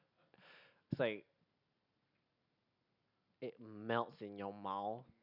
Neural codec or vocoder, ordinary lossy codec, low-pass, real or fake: none; none; 5.4 kHz; real